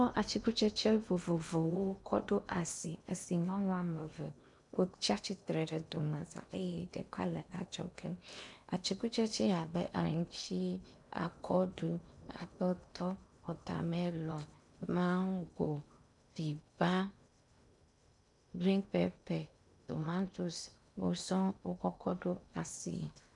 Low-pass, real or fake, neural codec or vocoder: 10.8 kHz; fake; codec, 16 kHz in and 24 kHz out, 0.8 kbps, FocalCodec, streaming, 65536 codes